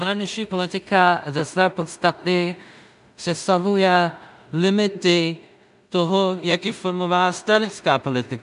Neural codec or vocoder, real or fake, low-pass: codec, 16 kHz in and 24 kHz out, 0.4 kbps, LongCat-Audio-Codec, two codebook decoder; fake; 10.8 kHz